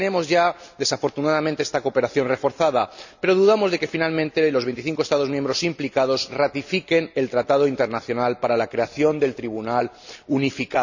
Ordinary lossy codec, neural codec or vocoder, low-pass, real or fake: none; none; 7.2 kHz; real